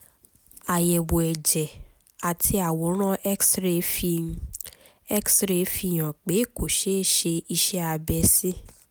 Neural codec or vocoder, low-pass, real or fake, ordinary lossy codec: none; none; real; none